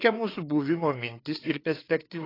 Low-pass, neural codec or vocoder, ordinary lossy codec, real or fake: 5.4 kHz; codec, 16 kHz, 4 kbps, FreqCodec, larger model; AAC, 24 kbps; fake